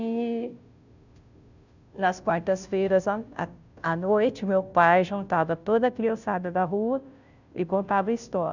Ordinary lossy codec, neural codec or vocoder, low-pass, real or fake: none; codec, 16 kHz, 0.5 kbps, FunCodec, trained on Chinese and English, 25 frames a second; 7.2 kHz; fake